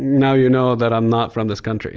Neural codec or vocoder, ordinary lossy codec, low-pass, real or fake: none; Opus, 24 kbps; 7.2 kHz; real